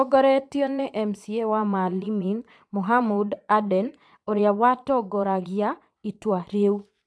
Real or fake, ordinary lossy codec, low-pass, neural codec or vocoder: fake; none; none; vocoder, 22.05 kHz, 80 mel bands, Vocos